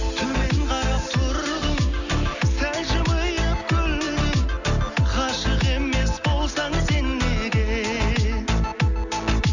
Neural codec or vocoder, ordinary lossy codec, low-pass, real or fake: none; none; 7.2 kHz; real